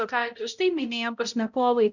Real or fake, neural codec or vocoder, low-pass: fake; codec, 16 kHz, 0.5 kbps, X-Codec, HuBERT features, trained on balanced general audio; 7.2 kHz